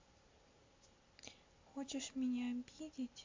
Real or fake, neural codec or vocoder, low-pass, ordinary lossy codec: real; none; 7.2 kHz; MP3, 48 kbps